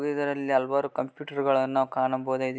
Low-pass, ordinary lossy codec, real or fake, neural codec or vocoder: none; none; real; none